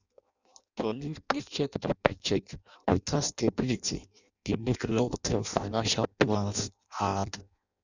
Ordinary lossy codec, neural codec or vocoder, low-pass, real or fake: none; codec, 16 kHz in and 24 kHz out, 0.6 kbps, FireRedTTS-2 codec; 7.2 kHz; fake